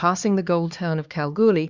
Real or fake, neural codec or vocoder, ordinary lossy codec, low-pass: fake; codec, 16 kHz, 2 kbps, X-Codec, HuBERT features, trained on LibriSpeech; Opus, 64 kbps; 7.2 kHz